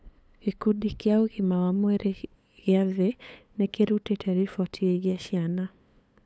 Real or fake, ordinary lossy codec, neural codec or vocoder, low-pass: fake; none; codec, 16 kHz, 8 kbps, FunCodec, trained on LibriTTS, 25 frames a second; none